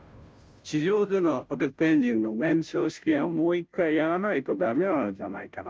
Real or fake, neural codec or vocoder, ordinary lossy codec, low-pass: fake; codec, 16 kHz, 0.5 kbps, FunCodec, trained on Chinese and English, 25 frames a second; none; none